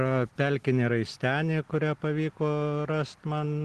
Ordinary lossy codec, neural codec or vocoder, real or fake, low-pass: Opus, 16 kbps; none; real; 10.8 kHz